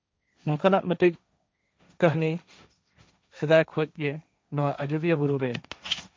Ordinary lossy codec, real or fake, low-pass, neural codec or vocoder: none; fake; none; codec, 16 kHz, 1.1 kbps, Voila-Tokenizer